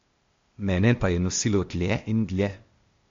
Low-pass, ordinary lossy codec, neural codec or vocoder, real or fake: 7.2 kHz; MP3, 48 kbps; codec, 16 kHz, 0.8 kbps, ZipCodec; fake